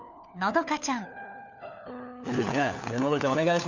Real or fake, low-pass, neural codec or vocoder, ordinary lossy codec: fake; 7.2 kHz; codec, 16 kHz, 4 kbps, FunCodec, trained on LibriTTS, 50 frames a second; none